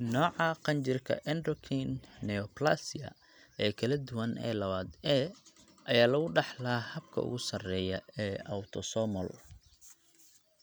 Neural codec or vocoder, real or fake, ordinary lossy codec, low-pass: none; real; none; none